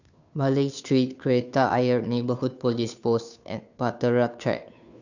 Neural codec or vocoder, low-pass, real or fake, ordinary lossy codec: codec, 16 kHz, 2 kbps, FunCodec, trained on Chinese and English, 25 frames a second; 7.2 kHz; fake; none